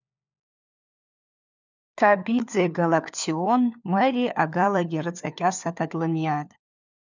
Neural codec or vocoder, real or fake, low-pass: codec, 16 kHz, 4 kbps, FunCodec, trained on LibriTTS, 50 frames a second; fake; 7.2 kHz